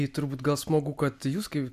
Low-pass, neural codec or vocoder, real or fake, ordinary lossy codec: 14.4 kHz; none; real; AAC, 96 kbps